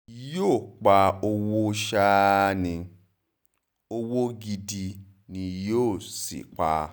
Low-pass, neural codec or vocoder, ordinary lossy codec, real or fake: none; none; none; real